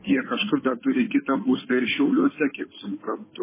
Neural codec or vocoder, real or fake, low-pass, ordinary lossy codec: codec, 16 kHz in and 24 kHz out, 2.2 kbps, FireRedTTS-2 codec; fake; 3.6 kHz; MP3, 16 kbps